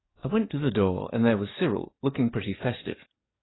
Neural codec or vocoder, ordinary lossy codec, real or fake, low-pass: codec, 44.1 kHz, 7.8 kbps, DAC; AAC, 16 kbps; fake; 7.2 kHz